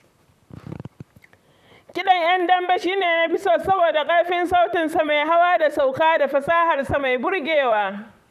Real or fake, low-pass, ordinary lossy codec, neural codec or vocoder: fake; 14.4 kHz; none; vocoder, 44.1 kHz, 128 mel bands, Pupu-Vocoder